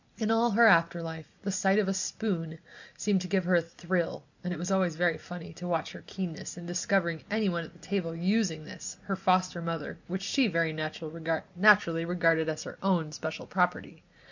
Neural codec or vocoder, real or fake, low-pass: none; real; 7.2 kHz